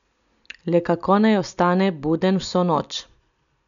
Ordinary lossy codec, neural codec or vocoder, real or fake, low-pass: none; none; real; 7.2 kHz